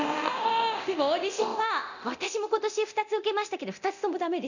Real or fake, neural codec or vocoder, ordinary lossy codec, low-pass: fake; codec, 24 kHz, 0.9 kbps, DualCodec; none; 7.2 kHz